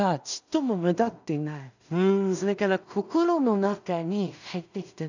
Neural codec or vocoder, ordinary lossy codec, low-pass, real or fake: codec, 16 kHz in and 24 kHz out, 0.4 kbps, LongCat-Audio-Codec, two codebook decoder; none; 7.2 kHz; fake